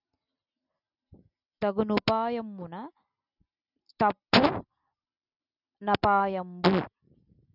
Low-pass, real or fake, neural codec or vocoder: 5.4 kHz; real; none